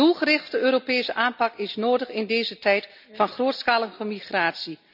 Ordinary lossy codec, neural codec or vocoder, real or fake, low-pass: none; none; real; 5.4 kHz